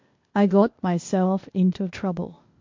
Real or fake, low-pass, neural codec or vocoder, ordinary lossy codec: fake; 7.2 kHz; codec, 16 kHz, 0.8 kbps, ZipCodec; MP3, 48 kbps